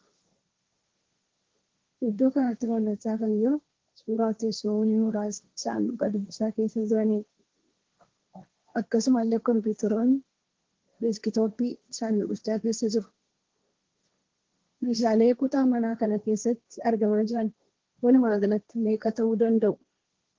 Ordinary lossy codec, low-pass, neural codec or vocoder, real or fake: Opus, 16 kbps; 7.2 kHz; codec, 16 kHz, 1.1 kbps, Voila-Tokenizer; fake